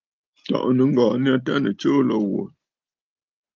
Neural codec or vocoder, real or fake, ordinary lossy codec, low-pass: none; real; Opus, 24 kbps; 7.2 kHz